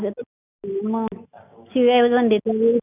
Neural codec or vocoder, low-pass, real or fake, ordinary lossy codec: none; 3.6 kHz; real; none